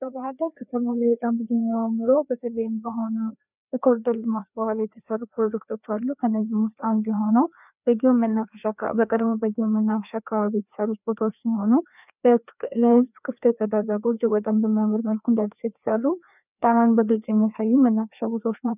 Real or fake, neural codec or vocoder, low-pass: fake; codec, 16 kHz, 2 kbps, FreqCodec, larger model; 3.6 kHz